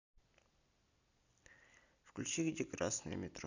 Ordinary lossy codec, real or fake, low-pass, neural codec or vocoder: none; real; 7.2 kHz; none